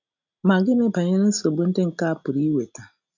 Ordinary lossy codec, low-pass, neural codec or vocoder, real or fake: none; 7.2 kHz; none; real